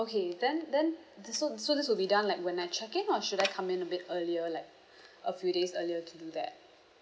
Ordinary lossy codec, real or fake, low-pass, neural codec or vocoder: none; real; none; none